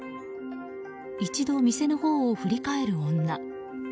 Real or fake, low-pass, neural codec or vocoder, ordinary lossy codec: real; none; none; none